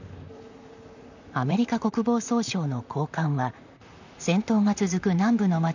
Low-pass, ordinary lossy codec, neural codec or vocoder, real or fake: 7.2 kHz; none; vocoder, 44.1 kHz, 128 mel bands, Pupu-Vocoder; fake